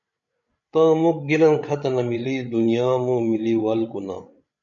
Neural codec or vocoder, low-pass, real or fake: codec, 16 kHz, 8 kbps, FreqCodec, larger model; 7.2 kHz; fake